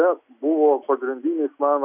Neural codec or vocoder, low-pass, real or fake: none; 3.6 kHz; real